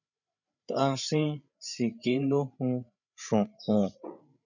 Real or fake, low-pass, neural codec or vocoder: fake; 7.2 kHz; codec, 16 kHz, 8 kbps, FreqCodec, larger model